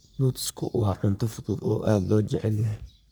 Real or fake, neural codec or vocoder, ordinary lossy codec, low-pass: fake; codec, 44.1 kHz, 3.4 kbps, Pupu-Codec; none; none